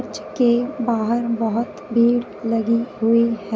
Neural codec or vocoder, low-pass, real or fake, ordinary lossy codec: none; none; real; none